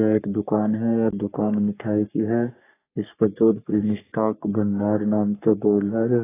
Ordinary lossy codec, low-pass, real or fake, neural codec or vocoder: AAC, 16 kbps; 3.6 kHz; fake; codec, 44.1 kHz, 3.4 kbps, Pupu-Codec